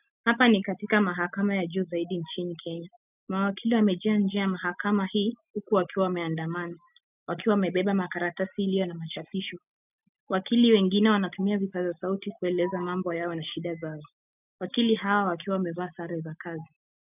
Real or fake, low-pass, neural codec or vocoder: real; 3.6 kHz; none